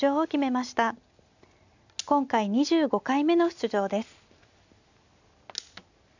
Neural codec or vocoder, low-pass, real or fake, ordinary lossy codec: none; 7.2 kHz; real; none